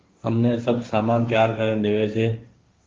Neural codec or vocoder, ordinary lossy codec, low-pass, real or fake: codec, 16 kHz, 1.1 kbps, Voila-Tokenizer; Opus, 32 kbps; 7.2 kHz; fake